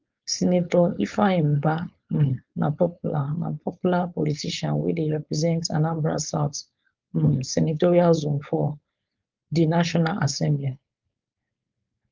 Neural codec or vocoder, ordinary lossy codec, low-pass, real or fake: codec, 16 kHz, 4.8 kbps, FACodec; Opus, 24 kbps; 7.2 kHz; fake